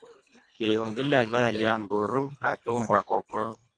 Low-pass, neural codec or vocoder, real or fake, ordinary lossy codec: 9.9 kHz; codec, 24 kHz, 1.5 kbps, HILCodec; fake; AAC, 48 kbps